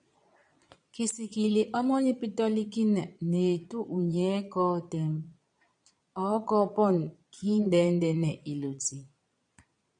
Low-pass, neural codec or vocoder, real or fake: 9.9 kHz; vocoder, 22.05 kHz, 80 mel bands, Vocos; fake